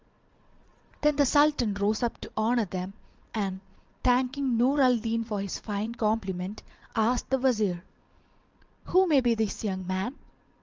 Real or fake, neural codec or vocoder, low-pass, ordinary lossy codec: fake; vocoder, 22.05 kHz, 80 mel bands, Vocos; 7.2 kHz; Opus, 32 kbps